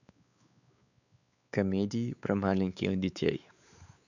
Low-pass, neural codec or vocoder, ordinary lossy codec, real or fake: 7.2 kHz; codec, 16 kHz, 4 kbps, X-Codec, WavLM features, trained on Multilingual LibriSpeech; none; fake